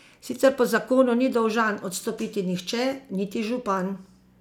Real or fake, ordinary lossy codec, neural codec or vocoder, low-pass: real; none; none; 19.8 kHz